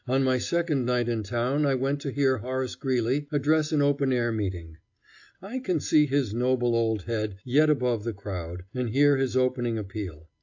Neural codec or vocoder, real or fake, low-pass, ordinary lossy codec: none; real; 7.2 kHz; AAC, 48 kbps